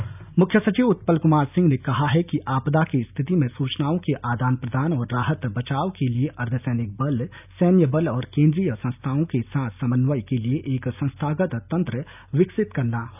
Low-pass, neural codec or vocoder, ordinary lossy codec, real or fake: 3.6 kHz; none; none; real